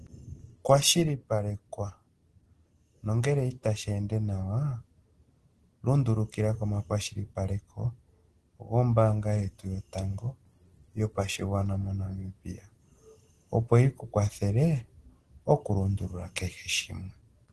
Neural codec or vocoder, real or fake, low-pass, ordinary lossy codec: none; real; 10.8 kHz; Opus, 16 kbps